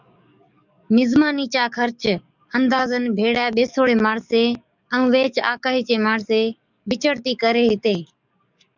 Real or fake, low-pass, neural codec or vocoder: fake; 7.2 kHz; codec, 44.1 kHz, 7.8 kbps, DAC